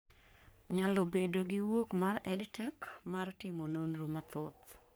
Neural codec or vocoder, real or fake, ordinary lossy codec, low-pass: codec, 44.1 kHz, 3.4 kbps, Pupu-Codec; fake; none; none